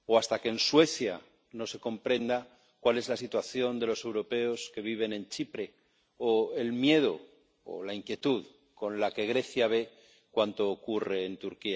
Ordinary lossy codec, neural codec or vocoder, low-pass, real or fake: none; none; none; real